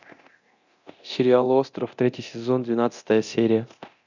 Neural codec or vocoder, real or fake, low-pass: codec, 24 kHz, 0.9 kbps, DualCodec; fake; 7.2 kHz